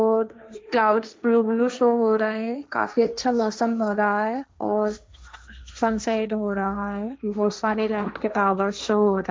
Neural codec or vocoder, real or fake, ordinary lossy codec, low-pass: codec, 16 kHz, 1.1 kbps, Voila-Tokenizer; fake; none; none